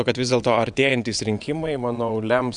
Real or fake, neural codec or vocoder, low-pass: fake; vocoder, 22.05 kHz, 80 mel bands, WaveNeXt; 9.9 kHz